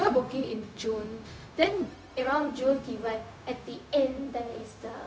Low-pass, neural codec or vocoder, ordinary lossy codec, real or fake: none; codec, 16 kHz, 0.4 kbps, LongCat-Audio-Codec; none; fake